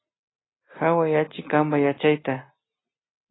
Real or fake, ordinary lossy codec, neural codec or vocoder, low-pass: real; AAC, 16 kbps; none; 7.2 kHz